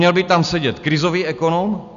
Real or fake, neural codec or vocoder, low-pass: real; none; 7.2 kHz